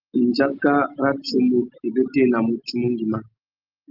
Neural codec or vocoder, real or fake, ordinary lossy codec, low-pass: none; real; Opus, 32 kbps; 5.4 kHz